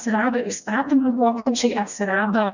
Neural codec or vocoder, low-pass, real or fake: codec, 16 kHz, 1 kbps, FreqCodec, smaller model; 7.2 kHz; fake